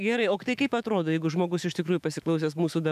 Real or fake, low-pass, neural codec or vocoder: fake; 14.4 kHz; autoencoder, 48 kHz, 128 numbers a frame, DAC-VAE, trained on Japanese speech